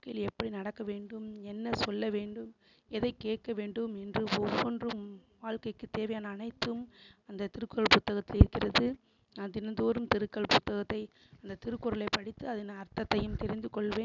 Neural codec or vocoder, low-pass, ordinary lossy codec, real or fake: none; 7.2 kHz; none; real